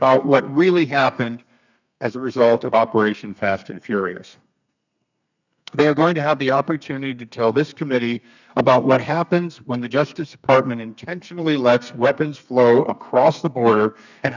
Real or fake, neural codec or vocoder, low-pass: fake; codec, 32 kHz, 1.9 kbps, SNAC; 7.2 kHz